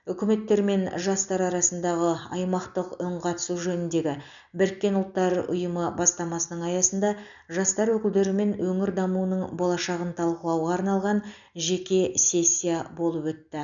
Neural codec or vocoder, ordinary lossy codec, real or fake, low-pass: none; none; real; 7.2 kHz